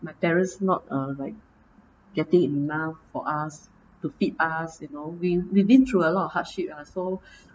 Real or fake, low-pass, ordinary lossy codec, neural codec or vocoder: real; none; none; none